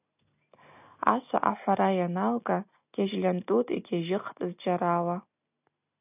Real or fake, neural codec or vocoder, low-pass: real; none; 3.6 kHz